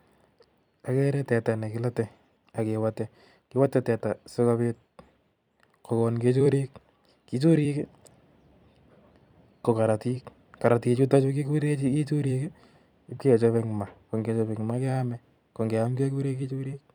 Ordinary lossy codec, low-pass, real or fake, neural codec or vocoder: none; 19.8 kHz; fake; vocoder, 44.1 kHz, 128 mel bands every 256 samples, BigVGAN v2